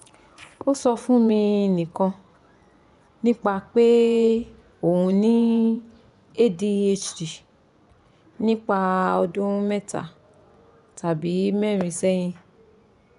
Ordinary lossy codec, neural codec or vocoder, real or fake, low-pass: none; vocoder, 24 kHz, 100 mel bands, Vocos; fake; 10.8 kHz